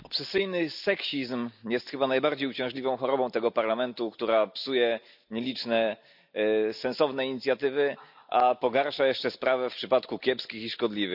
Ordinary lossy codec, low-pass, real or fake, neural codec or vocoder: none; 5.4 kHz; real; none